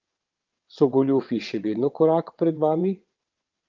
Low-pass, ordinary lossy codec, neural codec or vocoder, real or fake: 7.2 kHz; Opus, 32 kbps; vocoder, 22.05 kHz, 80 mel bands, WaveNeXt; fake